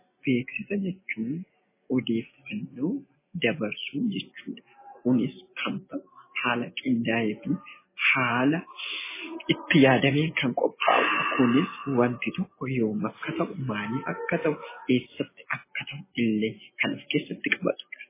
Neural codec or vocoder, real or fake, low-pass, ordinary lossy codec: none; real; 3.6 kHz; MP3, 16 kbps